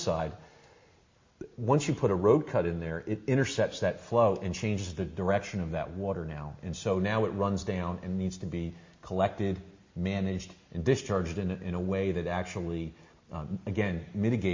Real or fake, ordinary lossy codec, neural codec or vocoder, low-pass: real; MP3, 32 kbps; none; 7.2 kHz